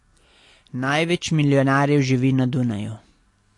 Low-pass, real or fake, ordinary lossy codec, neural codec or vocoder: 10.8 kHz; real; AAC, 48 kbps; none